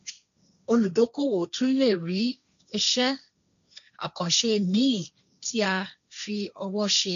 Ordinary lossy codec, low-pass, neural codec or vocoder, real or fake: AAC, 96 kbps; 7.2 kHz; codec, 16 kHz, 1.1 kbps, Voila-Tokenizer; fake